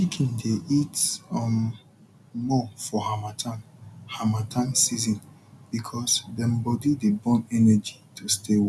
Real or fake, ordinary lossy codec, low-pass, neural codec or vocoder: real; none; none; none